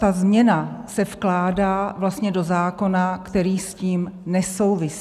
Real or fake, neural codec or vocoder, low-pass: real; none; 14.4 kHz